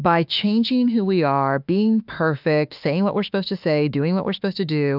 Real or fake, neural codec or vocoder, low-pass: fake; autoencoder, 48 kHz, 32 numbers a frame, DAC-VAE, trained on Japanese speech; 5.4 kHz